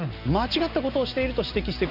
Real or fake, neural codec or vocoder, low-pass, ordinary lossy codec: real; none; 5.4 kHz; none